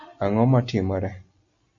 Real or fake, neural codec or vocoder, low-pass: real; none; 7.2 kHz